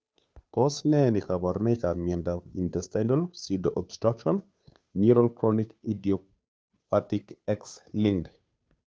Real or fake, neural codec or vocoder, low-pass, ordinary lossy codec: fake; codec, 16 kHz, 2 kbps, FunCodec, trained on Chinese and English, 25 frames a second; none; none